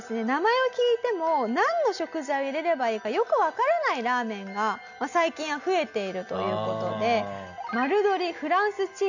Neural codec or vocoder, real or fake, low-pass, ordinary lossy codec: none; real; 7.2 kHz; none